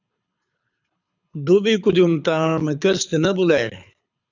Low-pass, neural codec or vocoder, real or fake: 7.2 kHz; codec, 24 kHz, 6 kbps, HILCodec; fake